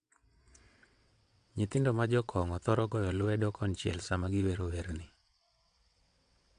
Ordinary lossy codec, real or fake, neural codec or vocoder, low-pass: AAC, 64 kbps; fake; vocoder, 22.05 kHz, 80 mel bands, WaveNeXt; 9.9 kHz